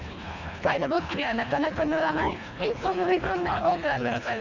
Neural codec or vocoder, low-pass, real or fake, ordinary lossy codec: codec, 24 kHz, 1.5 kbps, HILCodec; 7.2 kHz; fake; none